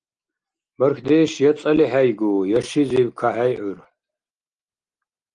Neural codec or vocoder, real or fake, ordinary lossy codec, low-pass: none; real; Opus, 24 kbps; 9.9 kHz